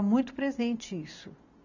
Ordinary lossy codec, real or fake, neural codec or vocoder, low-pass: none; real; none; 7.2 kHz